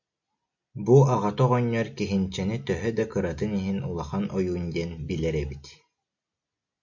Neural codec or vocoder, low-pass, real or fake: none; 7.2 kHz; real